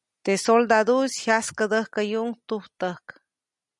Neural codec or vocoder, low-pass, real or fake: none; 10.8 kHz; real